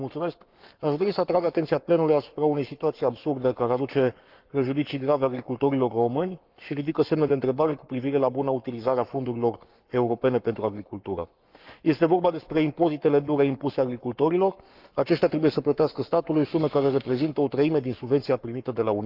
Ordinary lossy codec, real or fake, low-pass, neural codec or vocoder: Opus, 24 kbps; fake; 5.4 kHz; codec, 44.1 kHz, 7.8 kbps, Pupu-Codec